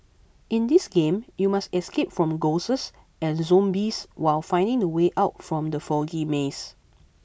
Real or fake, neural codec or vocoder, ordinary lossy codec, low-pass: real; none; none; none